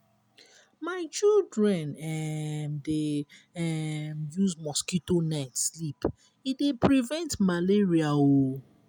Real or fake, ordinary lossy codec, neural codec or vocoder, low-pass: real; none; none; none